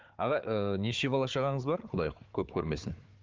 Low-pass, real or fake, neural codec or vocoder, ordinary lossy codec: 7.2 kHz; fake; codec, 16 kHz, 4 kbps, FunCodec, trained on Chinese and English, 50 frames a second; Opus, 32 kbps